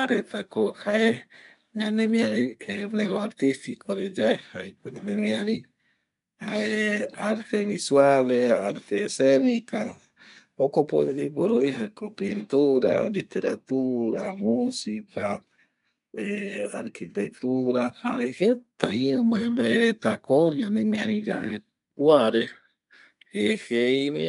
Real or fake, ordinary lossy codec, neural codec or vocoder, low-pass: fake; none; codec, 24 kHz, 1 kbps, SNAC; 10.8 kHz